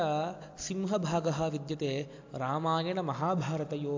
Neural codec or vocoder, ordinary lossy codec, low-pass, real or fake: none; AAC, 48 kbps; 7.2 kHz; real